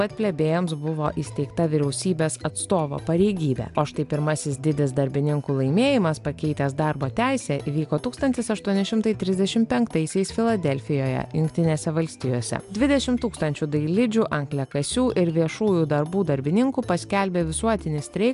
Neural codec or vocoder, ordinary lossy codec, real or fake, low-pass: none; AAC, 96 kbps; real; 10.8 kHz